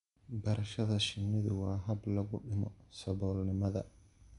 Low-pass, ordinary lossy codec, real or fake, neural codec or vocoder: 10.8 kHz; none; real; none